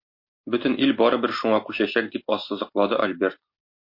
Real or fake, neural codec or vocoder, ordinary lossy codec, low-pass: real; none; MP3, 32 kbps; 5.4 kHz